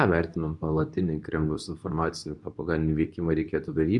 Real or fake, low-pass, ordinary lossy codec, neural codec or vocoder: fake; 10.8 kHz; Opus, 64 kbps; codec, 24 kHz, 0.9 kbps, WavTokenizer, medium speech release version 2